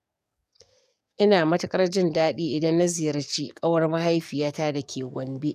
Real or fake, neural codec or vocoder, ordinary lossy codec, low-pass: fake; codec, 44.1 kHz, 7.8 kbps, DAC; none; 14.4 kHz